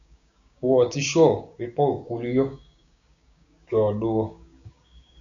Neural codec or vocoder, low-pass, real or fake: codec, 16 kHz, 6 kbps, DAC; 7.2 kHz; fake